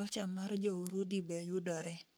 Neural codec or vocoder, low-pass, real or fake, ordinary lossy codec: codec, 44.1 kHz, 3.4 kbps, Pupu-Codec; none; fake; none